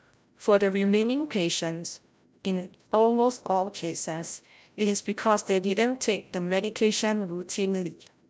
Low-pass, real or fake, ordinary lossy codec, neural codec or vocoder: none; fake; none; codec, 16 kHz, 0.5 kbps, FreqCodec, larger model